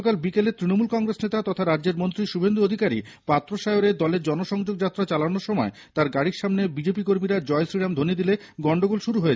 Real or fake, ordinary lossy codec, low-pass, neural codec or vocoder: real; none; none; none